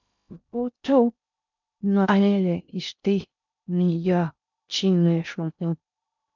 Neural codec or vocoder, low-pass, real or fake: codec, 16 kHz in and 24 kHz out, 0.6 kbps, FocalCodec, streaming, 2048 codes; 7.2 kHz; fake